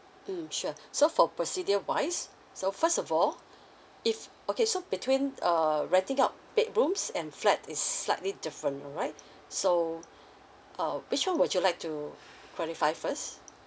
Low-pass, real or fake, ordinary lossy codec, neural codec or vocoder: none; real; none; none